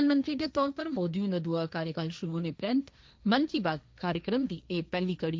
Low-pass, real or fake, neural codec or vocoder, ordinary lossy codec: none; fake; codec, 16 kHz, 1.1 kbps, Voila-Tokenizer; none